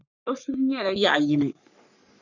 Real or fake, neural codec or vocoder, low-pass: fake; codec, 44.1 kHz, 3.4 kbps, Pupu-Codec; 7.2 kHz